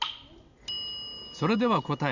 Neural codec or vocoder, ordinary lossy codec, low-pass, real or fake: none; Opus, 64 kbps; 7.2 kHz; real